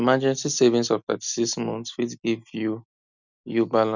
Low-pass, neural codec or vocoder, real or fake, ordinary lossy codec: 7.2 kHz; none; real; none